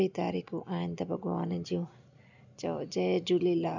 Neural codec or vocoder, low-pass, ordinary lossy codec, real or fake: none; 7.2 kHz; none; real